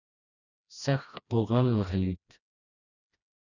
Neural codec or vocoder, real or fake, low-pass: codec, 16 kHz, 1 kbps, FreqCodec, smaller model; fake; 7.2 kHz